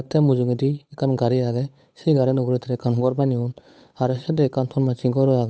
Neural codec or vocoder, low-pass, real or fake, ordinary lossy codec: codec, 16 kHz, 8 kbps, FunCodec, trained on Chinese and English, 25 frames a second; none; fake; none